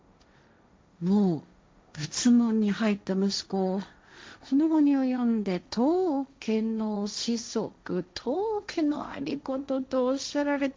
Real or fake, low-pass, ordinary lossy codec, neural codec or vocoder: fake; none; none; codec, 16 kHz, 1.1 kbps, Voila-Tokenizer